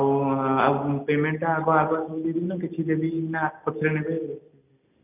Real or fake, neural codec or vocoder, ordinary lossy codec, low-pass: real; none; none; 3.6 kHz